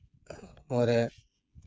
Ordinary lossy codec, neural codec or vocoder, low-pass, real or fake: none; codec, 16 kHz, 8 kbps, FreqCodec, smaller model; none; fake